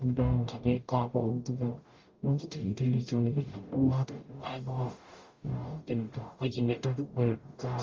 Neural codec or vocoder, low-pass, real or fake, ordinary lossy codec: codec, 44.1 kHz, 0.9 kbps, DAC; 7.2 kHz; fake; Opus, 32 kbps